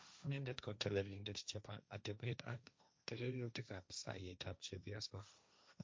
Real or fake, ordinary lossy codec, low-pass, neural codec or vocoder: fake; none; none; codec, 16 kHz, 1.1 kbps, Voila-Tokenizer